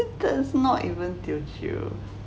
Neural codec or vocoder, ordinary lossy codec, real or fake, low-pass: none; none; real; none